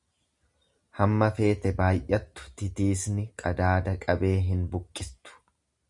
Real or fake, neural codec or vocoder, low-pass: real; none; 10.8 kHz